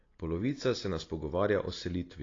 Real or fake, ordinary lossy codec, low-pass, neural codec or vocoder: real; AAC, 32 kbps; 7.2 kHz; none